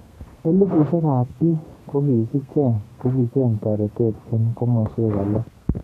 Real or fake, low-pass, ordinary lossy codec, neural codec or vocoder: fake; 14.4 kHz; none; codec, 32 kHz, 1.9 kbps, SNAC